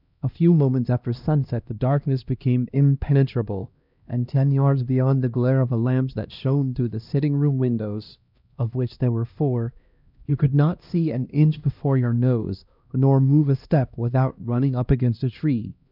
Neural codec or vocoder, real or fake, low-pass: codec, 16 kHz, 1 kbps, X-Codec, HuBERT features, trained on LibriSpeech; fake; 5.4 kHz